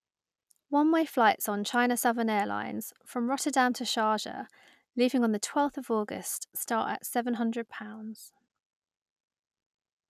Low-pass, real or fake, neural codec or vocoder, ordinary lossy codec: 14.4 kHz; real; none; none